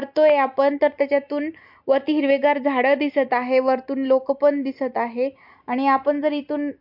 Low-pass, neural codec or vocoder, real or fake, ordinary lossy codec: 5.4 kHz; none; real; MP3, 48 kbps